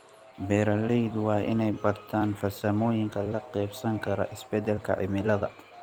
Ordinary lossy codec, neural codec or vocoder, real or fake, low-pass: Opus, 32 kbps; vocoder, 44.1 kHz, 128 mel bands every 256 samples, BigVGAN v2; fake; 19.8 kHz